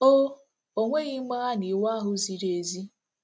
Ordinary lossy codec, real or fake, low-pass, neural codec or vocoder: none; real; none; none